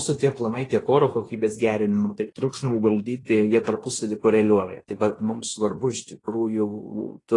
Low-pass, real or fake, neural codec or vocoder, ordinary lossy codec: 10.8 kHz; fake; codec, 16 kHz in and 24 kHz out, 0.9 kbps, LongCat-Audio-Codec, fine tuned four codebook decoder; AAC, 32 kbps